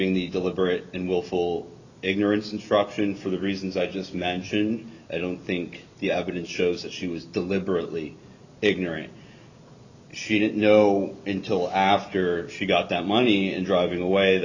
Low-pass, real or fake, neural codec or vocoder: 7.2 kHz; real; none